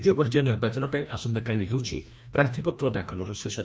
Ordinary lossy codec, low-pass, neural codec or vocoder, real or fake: none; none; codec, 16 kHz, 1 kbps, FreqCodec, larger model; fake